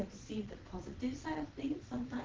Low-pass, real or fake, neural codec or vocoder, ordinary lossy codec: 7.2 kHz; fake; vocoder, 22.05 kHz, 80 mel bands, Vocos; Opus, 16 kbps